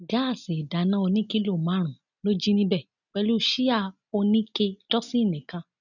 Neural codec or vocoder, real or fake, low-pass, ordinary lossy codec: none; real; 7.2 kHz; none